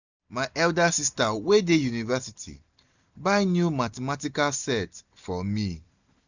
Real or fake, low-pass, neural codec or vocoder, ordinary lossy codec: real; 7.2 kHz; none; none